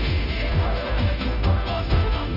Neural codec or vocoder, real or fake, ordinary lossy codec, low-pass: codec, 16 kHz, 0.5 kbps, FunCodec, trained on Chinese and English, 25 frames a second; fake; none; 5.4 kHz